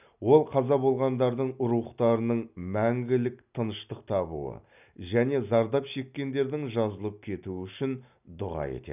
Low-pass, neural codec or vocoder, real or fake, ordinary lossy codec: 3.6 kHz; none; real; none